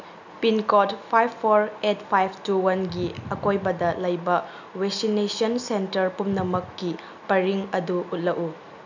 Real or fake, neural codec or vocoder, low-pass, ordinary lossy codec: real; none; 7.2 kHz; none